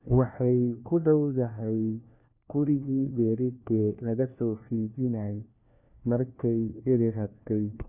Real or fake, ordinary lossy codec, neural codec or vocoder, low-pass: fake; none; codec, 16 kHz, 1 kbps, FunCodec, trained on LibriTTS, 50 frames a second; 3.6 kHz